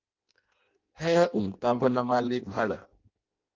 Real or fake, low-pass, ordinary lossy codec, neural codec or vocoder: fake; 7.2 kHz; Opus, 32 kbps; codec, 16 kHz in and 24 kHz out, 0.6 kbps, FireRedTTS-2 codec